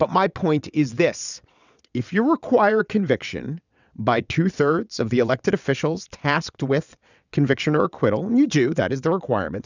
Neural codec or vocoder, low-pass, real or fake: vocoder, 22.05 kHz, 80 mel bands, WaveNeXt; 7.2 kHz; fake